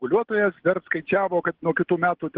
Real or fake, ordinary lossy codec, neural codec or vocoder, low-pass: real; Opus, 16 kbps; none; 5.4 kHz